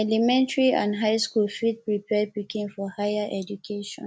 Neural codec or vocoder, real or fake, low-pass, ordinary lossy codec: none; real; none; none